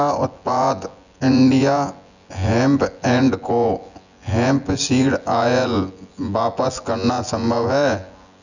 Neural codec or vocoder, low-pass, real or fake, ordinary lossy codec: vocoder, 24 kHz, 100 mel bands, Vocos; 7.2 kHz; fake; none